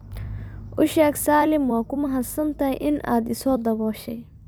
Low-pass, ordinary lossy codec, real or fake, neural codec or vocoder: none; none; fake; vocoder, 44.1 kHz, 128 mel bands every 256 samples, BigVGAN v2